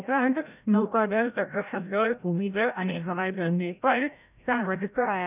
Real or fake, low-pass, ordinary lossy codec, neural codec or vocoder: fake; 3.6 kHz; none; codec, 16 kHz, 0.5 kbps, FreqCodec, larger model